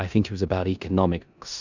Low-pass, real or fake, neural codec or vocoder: 7.2 kHz; fake; codec, 16 kHz in and 24 kHz out, 0.9 kbps, LongCat-Audio-Codec, four codebook decoder